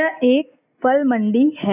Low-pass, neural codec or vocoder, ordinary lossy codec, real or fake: 3.6 kHz; none; none; real